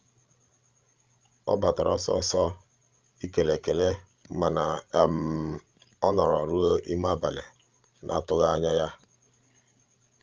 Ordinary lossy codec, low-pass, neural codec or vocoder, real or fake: Opus, 24 kbps; 7.2 kHz; codec, 16 kHz, 16 kbps, FreqCodec, larger model; fake